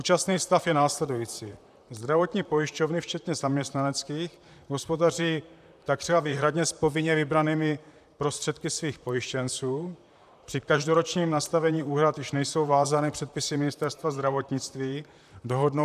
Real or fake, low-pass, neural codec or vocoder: fake; 14.4 kHz; vocoder, 44.1 kHz, 128 mel bands, Pupu-Vocoder